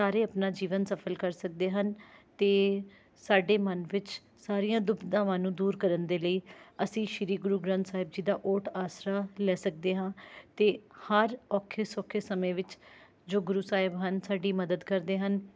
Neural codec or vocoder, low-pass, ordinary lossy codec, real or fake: none; none; none; real